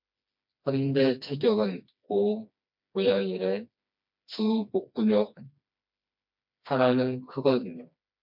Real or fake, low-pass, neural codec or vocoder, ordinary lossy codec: fake; 5.4 kHz; codec, 16 kHz, 2 kbps, FreqCodec, smaller model; MP3, 48 kbps